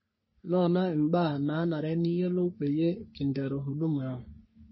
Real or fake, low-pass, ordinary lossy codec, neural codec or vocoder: fake; 7.2 kHz; MP3, 24 kbps; codec, 44.1 kHz, 3.4 kbps, Pupu-Codec